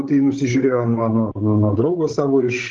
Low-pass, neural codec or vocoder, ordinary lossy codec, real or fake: 7.2 kHz; codec, 16 kHz, 16 kbps, FreqCodec, larger model; Opus, 16 kbps; fake